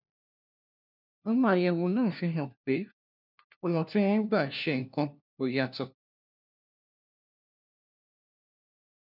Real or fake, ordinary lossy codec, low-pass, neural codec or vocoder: fake; none; 5.4 kHz; codec, 16 kHz, 1 kbps, FunCodec, trained on LibriTTS, 50 frames a second